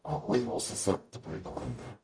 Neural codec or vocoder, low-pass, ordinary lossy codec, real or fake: codec, 44.1 kHz, 0.9 kbps, DAC; 9.9 kHz; none; fake